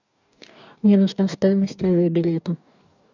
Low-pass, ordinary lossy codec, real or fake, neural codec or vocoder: 7.2 kHz; none; fake; codec, 44.1 kHz, 2.6 kbps, DAC